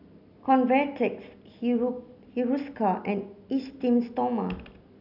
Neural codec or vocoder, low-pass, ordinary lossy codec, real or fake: none; 5.4 kHz; none; real